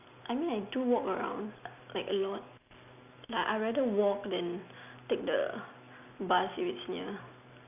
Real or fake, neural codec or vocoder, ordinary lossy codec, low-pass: real; none; none; 3.6 kHz